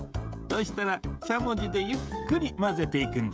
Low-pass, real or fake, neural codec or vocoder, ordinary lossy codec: none; fake; codec, 16 kHz, 16 kbps, FreqCodec, smaller model; none